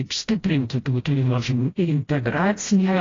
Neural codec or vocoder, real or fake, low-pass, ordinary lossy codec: codec, 16 kHz, 0.5 kbps, FreqCodec, smaller model; fake; 7.2 kHz; AAC, 32 kbps